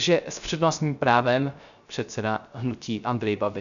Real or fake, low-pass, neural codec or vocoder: fake; 7.2 kHz; codec, 16 kHz, 0.3 kbps, FocalCodec